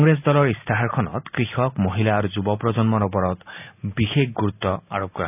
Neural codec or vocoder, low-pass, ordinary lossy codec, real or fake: none; 3.6 kHz; none; real